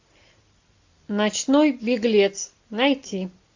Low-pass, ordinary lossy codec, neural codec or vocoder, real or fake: 7.2 kHz; AAC, 48 kbps; none; real